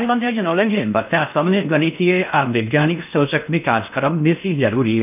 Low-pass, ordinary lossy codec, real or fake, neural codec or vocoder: 3.6 kHz; none; fake; codec, 16 kHz in and 24 kHz out, 0.6 kbps, FocalCodec, streaming, 4096 codes